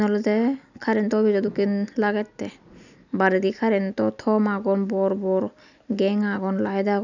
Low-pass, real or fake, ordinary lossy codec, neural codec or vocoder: 7.2 kHz; real; none; none